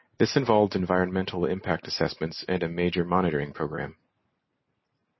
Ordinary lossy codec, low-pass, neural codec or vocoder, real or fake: MP3, 24 kbps; 7.2 kHz; none; real